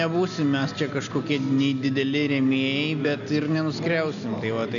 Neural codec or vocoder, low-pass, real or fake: none; 7.2 kHz; real